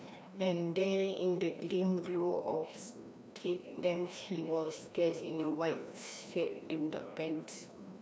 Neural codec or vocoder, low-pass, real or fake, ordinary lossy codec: codec, 16 kHz, 1 kbps, FreqCodec, larger model; none; fake; none